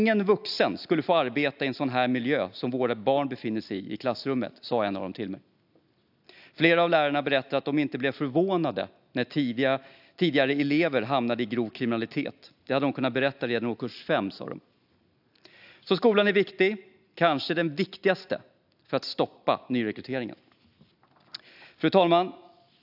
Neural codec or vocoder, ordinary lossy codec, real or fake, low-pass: none; AAC, 48 kbps; real; 5.4 kHz